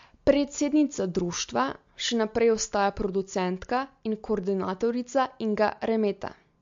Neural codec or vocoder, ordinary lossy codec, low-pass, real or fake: none; MP3, 48 kbps; 7.2 kHz; real